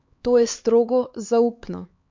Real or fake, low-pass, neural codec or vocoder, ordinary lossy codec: fake; 7.2 kHz; codec, 16 kHz, 4 kbps, X-Codec, HuBERT features, trained on LibriSpeech; MP3, 48 kbps